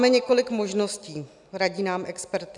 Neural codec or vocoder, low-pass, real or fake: none; 10.8 kHz; real